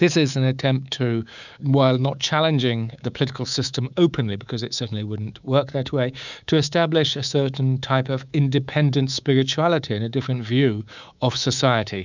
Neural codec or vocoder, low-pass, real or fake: codec, 24 kHz, 3.1 kbps, DualCodec; 7.2 kHz; fake